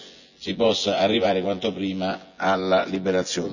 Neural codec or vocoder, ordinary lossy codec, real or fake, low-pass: vocoder, 24 kHz, 100 mel bands, Vocos; none; fake; 7.2 kHz